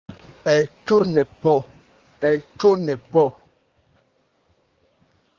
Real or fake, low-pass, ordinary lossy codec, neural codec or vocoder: fake; 7.2 kHz; Opus, 32 kbps; codec, 24 kHz, 1 kbps, SNAC